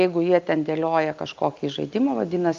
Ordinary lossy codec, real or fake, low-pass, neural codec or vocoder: Opus, 24 kbps; real; 7.2 kHz; none